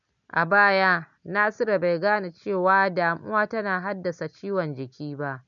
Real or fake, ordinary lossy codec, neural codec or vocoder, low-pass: real; none; none; 7.2 kHz